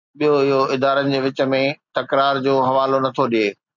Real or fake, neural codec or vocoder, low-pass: real; none; 7.2 kHz